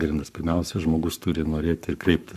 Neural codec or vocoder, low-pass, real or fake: codec, 44.1 kHz, 7.8 kbps, Pupu-Codec; 14.4 kHz; fake